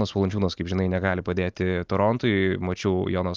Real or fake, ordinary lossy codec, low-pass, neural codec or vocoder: real; Opus, 32 kbps; 7.2 kHz; none